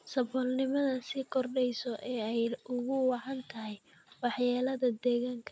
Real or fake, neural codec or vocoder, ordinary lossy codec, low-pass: real; none; none; none